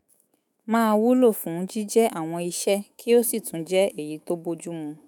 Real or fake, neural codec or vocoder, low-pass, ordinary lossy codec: fake; autoencoder, 48 kHz, 128 numbers a frame, DAC-VAE, trained on Japanese speech; none; none